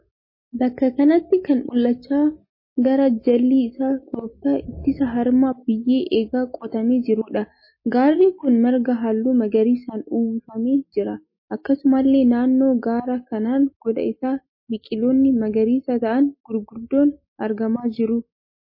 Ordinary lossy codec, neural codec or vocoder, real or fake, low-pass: MP3, 24 kbps; none; real; 5.4 kHz